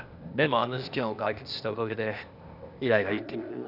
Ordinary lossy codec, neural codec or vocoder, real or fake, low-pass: none; codec, 16 kHz, 0.8 kbps, ZipCodec; fake; 5.4 kHz